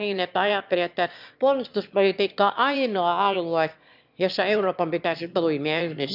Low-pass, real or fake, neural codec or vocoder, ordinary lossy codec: 5.4 kHz; fake; autoencoder, 22.05 kHz, a latent of 192 numbers a frame, VITS, trained on one speaker; none